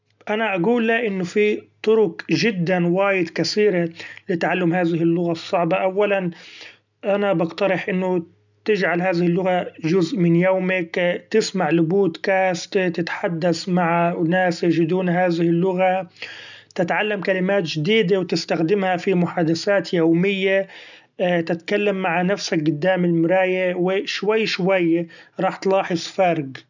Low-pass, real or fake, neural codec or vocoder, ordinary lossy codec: 7.2 kHz; real; none; none